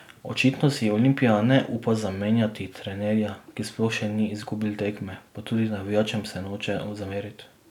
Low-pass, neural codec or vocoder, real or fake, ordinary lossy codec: 19.8 kHz; none; real; none